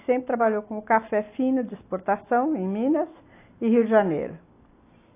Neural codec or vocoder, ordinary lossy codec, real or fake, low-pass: none; AAC, 24 kbps; real; 3.6 kHz